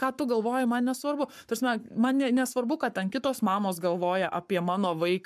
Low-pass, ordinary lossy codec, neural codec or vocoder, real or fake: 14.4 kHz; MP3, 96 kbps; codec, 44.1 kHz, 7.8 kbps, Pupu-Codec; fake